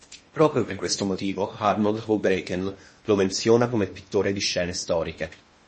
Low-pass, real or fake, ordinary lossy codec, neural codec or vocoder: 10.8 kHz; fake; MP3, 32 kbps; codec, 16 kHz in and 24 kHz out, 0.8 kbps, FocalCodec, streaming, 65536 codes